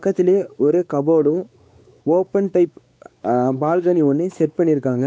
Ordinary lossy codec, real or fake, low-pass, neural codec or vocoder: none; fake; none; codec, 16 kHz, 4 kbps, X-Codec, WavLM features, trained on Multilingual LibriSpeech